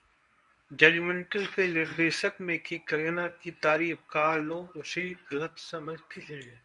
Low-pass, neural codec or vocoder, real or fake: 10.8 kHz; codec, 24 kHz, 0.9 kbps, WavTokenizer, medium speech release version 1; fake